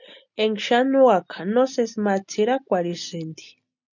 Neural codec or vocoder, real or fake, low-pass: none; real; 7.2 kHz